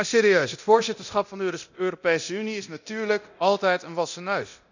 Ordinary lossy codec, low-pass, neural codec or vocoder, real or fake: none; 7.2 kHz; codec, 24 kHz, 0.9 kbps, DualCodec; fake